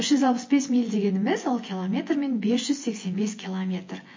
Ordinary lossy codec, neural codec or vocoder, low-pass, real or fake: MP3, 32 kbps; vocoder, 24 kHz, 100 mel bands, Vocos; 7.2 kHz; fake